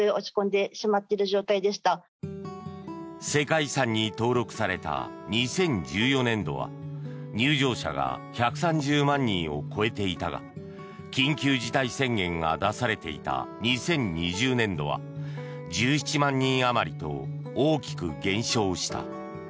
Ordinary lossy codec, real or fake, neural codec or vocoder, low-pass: none; real; none; none